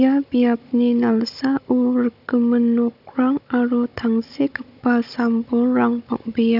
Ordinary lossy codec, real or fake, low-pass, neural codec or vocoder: none; fake; 5.4 kHz; codec, 16 kHz, 16 kbps, FunCodec, trained on Chinese and English, 50 frames a second